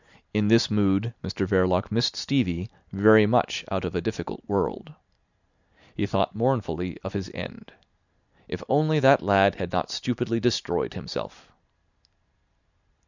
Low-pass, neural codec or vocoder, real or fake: 7.2 kHz; none; real